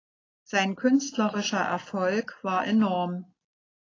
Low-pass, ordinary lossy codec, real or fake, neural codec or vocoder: 7.2 kHz; AAC, 32 kbps; real; none